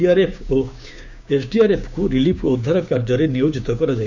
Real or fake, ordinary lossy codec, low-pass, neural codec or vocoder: fake; none; 7.2 kHz; codec, 16 kHz, 6 kbps, DAC